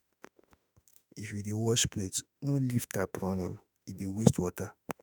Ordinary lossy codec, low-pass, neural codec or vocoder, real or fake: none; none; autoencoder, 48 kHz, 32 numbers a frame, DAC-VAE, trained on Japanese speech; fake